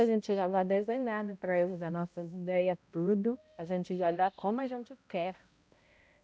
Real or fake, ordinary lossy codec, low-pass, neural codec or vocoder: fake; none; none; codec, 16 kHz, 0.5 kbps, X-Codec, HuBERT features, trained on balanced general audio